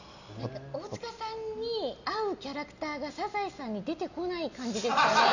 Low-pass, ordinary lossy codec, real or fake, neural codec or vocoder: 7.2 kHz; none; real; none